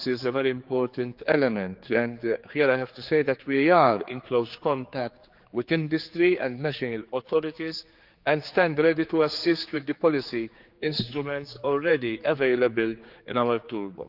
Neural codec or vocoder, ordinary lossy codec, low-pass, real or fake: codec, 16 kHz, 4 kbps, X-Codec, HuBERT features, trained on general audio; Opus, 24 kbps; 5.4 kHz; fake